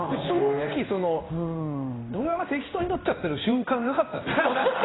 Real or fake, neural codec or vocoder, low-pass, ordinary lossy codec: fake; codec, 16 kHz in and 24 kHz out, 1 kbps, XY-Tokenizer; 7.2 kHz; AAC, 16 kbps